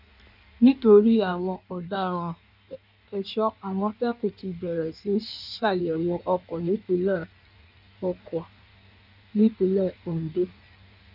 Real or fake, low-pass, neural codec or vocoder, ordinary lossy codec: fake; 5.4 kHz; codec, 16 kHz in and 24 kHz out, 1.1 kbps, FireRedTTS-2 codec; none